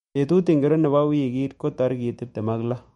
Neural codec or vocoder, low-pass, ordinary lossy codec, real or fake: autoencoder, 48 kHz, 128 numbers a frame, DAC-VAE, trained on Japanese speech; 19.8 kHz; MP3, 48 kbps; fake